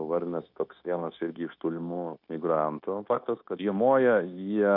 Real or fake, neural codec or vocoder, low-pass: fake; codec, 16 kHz, 0.9 kbps, LongCat-Audio-Codec; 5.4 kHz